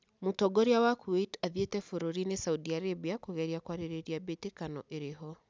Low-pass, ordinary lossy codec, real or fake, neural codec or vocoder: none; none; real; none